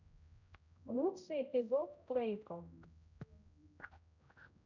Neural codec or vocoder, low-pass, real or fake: codec, 16 kHz, 0.5 kbps, X-Codec, HuBERT features, trained on general audio; 7.2 kHz; fake